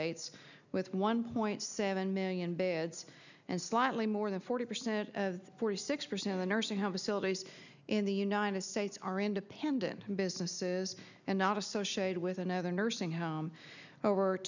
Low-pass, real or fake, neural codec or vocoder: 7.2 kHz; real; none